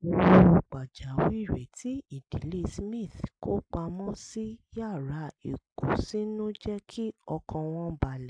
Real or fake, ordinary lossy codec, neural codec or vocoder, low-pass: real; AAC, 64 kbps; none; 9.9 kHz